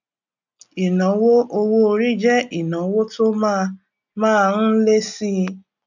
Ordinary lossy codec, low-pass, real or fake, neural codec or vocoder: none; 7.2 kHz; real; none